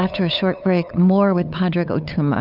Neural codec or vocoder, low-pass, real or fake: codec, 16 kHz, 8 kbps, FunCodec, trained on LibriTTS, 25 frames a second; 5.4 kHz; fake